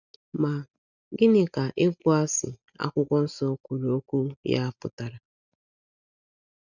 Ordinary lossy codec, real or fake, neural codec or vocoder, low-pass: none; real; none; 7.2 kHz